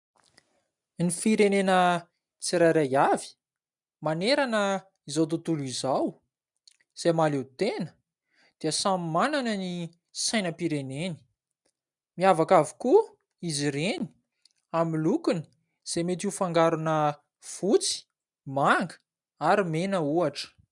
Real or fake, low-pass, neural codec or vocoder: real; 10.8 kHz; none